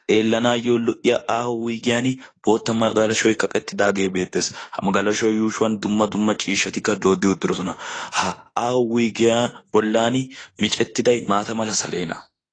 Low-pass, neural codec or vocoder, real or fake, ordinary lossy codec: 9.9 kHz; autoencoder, 48 kHz, 32 numbers a frame, DAC-VAE, trained on Japanese speech; fake; AAC, 32 kbps